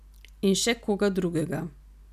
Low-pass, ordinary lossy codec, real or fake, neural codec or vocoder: 14.4 kHz; none; real; none